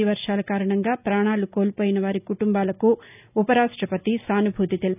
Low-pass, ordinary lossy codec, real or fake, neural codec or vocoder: 3.6 kHz; none; real; none